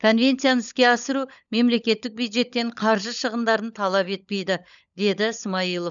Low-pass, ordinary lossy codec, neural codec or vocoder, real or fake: 7.2 kHz; none; codec, 16 kHz, 16 kbps, FunCodec, trained on LibriTTS, 50 frames a second; fake